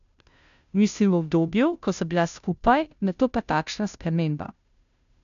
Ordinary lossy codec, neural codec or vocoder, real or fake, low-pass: none; codec, 16 kHz, 0.5 kbps, FunCodec, trained on Chinese and English, 25 frames a second; fake; 7.2 kHz